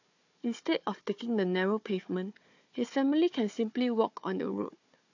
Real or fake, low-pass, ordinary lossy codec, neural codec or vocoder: fake; 7.2 kHz; AAC, 48 kbps; codec, 16 kHz, 4 kbps, FunCodec, trained on Chinese and English, 50 frames a second